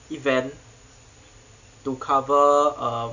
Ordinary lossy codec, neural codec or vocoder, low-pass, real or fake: none; none; 7.2 kHz; real